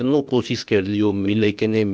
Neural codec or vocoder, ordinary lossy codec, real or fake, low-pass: codec, 16 kHz, 0.8 kbps, ZipCodec; none; fake; none